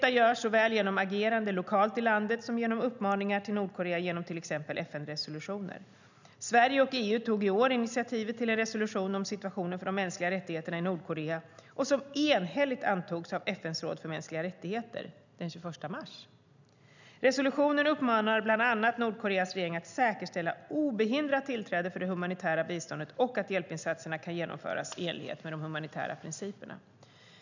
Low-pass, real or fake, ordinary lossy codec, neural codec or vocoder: 7.2 kHz; real; none; none